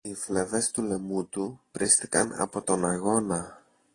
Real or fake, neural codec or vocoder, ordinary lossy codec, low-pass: real; none; AAC, 32 kbps; 10.8 kHz